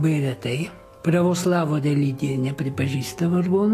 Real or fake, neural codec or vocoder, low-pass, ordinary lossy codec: fake; autoencoder, 48 kHz, 128 numbers a frame, DAC-VAE, trained on Japanese speech; 14.4 kHz; AAC, 48 kbps